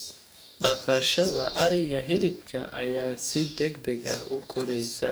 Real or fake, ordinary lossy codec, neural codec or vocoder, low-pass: fake; none; codec, 44.1 kHz, 2.6 kbps, DAC; none